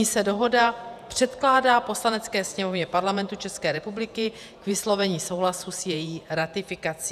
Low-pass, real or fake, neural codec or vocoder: 14.4 kHz; fake; vocoder, 48 kHz, 128 mel bands, Vocos